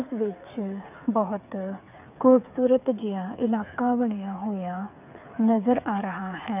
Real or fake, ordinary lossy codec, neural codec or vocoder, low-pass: fake; none; codec, 16 kHz, 8 kbps, FreqCodec, smaller model; 3.6 kHz